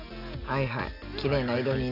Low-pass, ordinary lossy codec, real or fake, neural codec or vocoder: 5.4 kHz; none; real; none